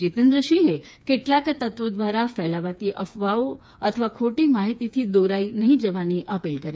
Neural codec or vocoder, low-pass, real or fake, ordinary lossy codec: codec, 16 kHz, 4 kbps, FreqCodec, smaller model; none; fake; none